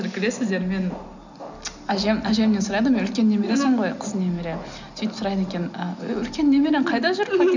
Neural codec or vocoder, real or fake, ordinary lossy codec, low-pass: none; real; none; 7.2 kHz